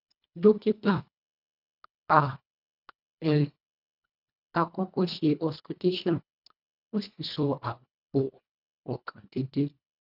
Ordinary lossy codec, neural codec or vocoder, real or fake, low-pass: none; codec, 24 kHz, 1.5 kbps, HILCodec; fake; 5.4 kHz